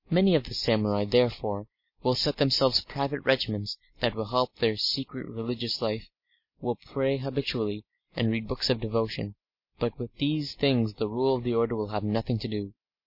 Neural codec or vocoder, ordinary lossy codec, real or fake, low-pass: none; MP3, 32 kbps; real; 5.4 kHz